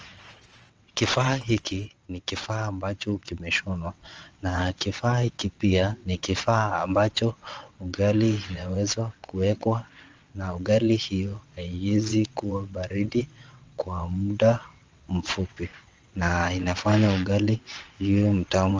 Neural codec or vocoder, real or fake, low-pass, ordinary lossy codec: vocoder, 22.05 kHz, 80 mel bands, WaveNeXt; fake; 7.2 kHz; Opus, 24 kbps